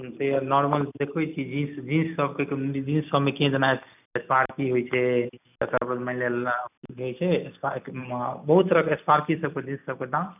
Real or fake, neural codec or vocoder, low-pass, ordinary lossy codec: real; none; 3.6 kHz; none